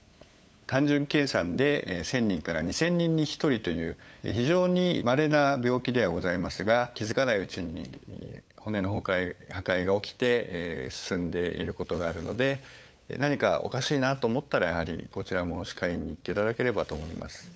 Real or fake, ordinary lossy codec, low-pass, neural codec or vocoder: fake; none; none; codec, 16 kHz, 8 kbps, FunCodec, trained on LibriTTS, 25 frames a second